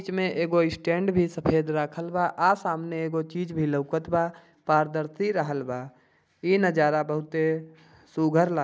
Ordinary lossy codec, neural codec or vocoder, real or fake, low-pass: none; none; real; none